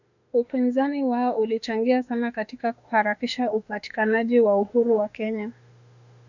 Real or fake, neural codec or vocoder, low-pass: fake; autoencoder, 48 kHz, 32 numbers a frame, DAC-VAE, trained on Japanese speech; 7.2 kHz